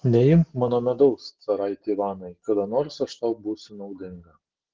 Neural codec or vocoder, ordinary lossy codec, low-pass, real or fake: none; Opus, 16 kbps; 7.2 kHz; real